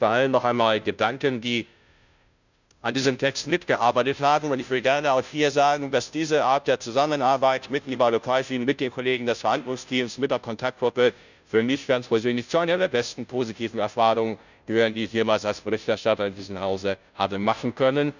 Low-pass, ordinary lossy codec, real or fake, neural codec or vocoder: 7.2 kHz; none; fake; codec, 16 kHz, 0.5 kbps, FunCodec, trained on Chinese and English, 25 frames a second